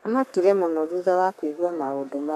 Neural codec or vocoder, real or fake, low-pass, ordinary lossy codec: codec, 32 kHz, 1.9 kbps, SNAC; fake; 14.4 kHz; none